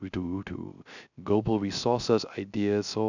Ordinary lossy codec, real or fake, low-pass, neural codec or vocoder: none; fake; 7.2 kHz; codec, 16 kHz, 0.3 kbps, FocalCodec